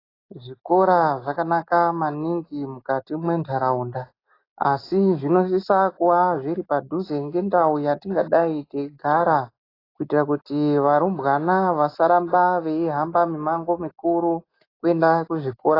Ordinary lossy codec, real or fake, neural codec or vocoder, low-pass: AAC, 24 kbps; real; none; 5.4 kHz